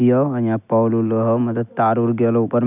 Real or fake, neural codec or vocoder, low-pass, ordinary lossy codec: real; none; 3.6 kHz; none